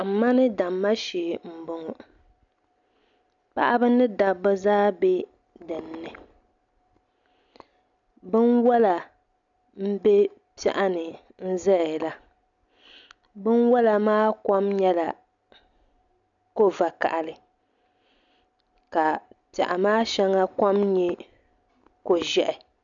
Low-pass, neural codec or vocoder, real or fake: 7.2 kHz; none; real